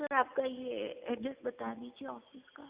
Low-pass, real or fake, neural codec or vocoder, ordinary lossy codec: 3.6 kHz; real; none; Opus, 64 kbps